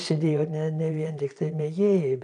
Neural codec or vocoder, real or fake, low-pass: vocoder, 22.05 kHz, 80 mel bands, Vocos; fake; 9.9 kHz